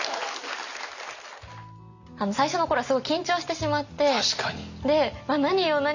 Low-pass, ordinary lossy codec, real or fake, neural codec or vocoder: 7.2 kHz; none; real; none